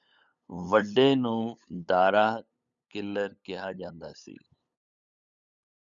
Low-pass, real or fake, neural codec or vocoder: 7.2 kHz; fake; codec, 16 kHz, 8 kbps, FunCodec, trained on LibriTTS, 25 frames a second